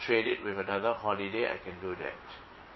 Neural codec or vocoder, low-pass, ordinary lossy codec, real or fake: vocoder, 22.05 kHz, 80 mel bands, WaveNeXt; 7.2 kHz; MP3, 24 kbps; fake